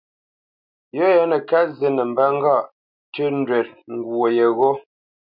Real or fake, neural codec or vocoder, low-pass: real; none; 5.4 kHz